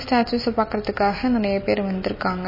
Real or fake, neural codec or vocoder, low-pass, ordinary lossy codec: real; none; 5.4 kHz; MP3, 24 kbps